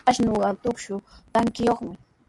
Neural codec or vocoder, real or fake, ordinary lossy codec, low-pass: none; real; AAC, 64 kbps; 10.8 kHz